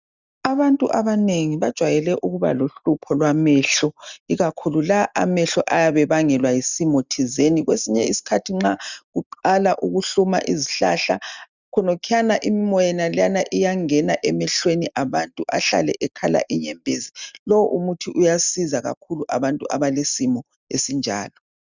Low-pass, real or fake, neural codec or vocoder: 7.2 kHz; real; none